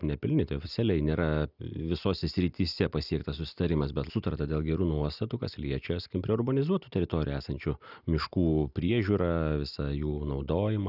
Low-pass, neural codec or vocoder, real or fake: 5.4 kHz; none; real